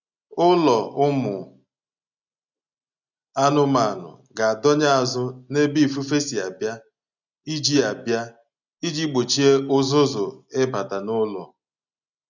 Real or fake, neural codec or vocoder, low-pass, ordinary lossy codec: real; none; 7.2 kHz; none